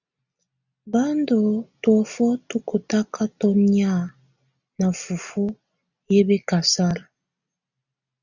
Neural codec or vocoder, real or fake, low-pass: none; real; 7.2 kHz